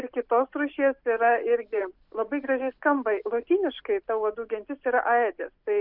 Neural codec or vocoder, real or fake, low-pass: none; real; 5.4 kHz